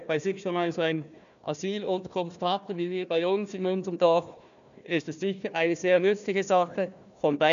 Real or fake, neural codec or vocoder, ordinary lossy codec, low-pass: fake; codec, 16 kHz, 1 kbps, FunCodec, trained on Chinese and English, 50 frames a second; none; 7.2 kHz